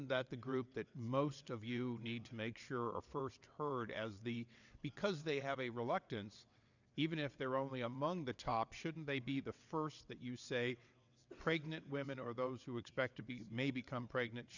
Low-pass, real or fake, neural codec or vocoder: 7.2 kHz; fake; vocoder, 22.05 kHz, 80 mel bands, WaveNeXt